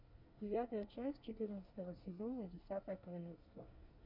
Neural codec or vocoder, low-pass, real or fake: codec, 24 kHz, 1 kbps, SNAC; 5.4 kHz; fake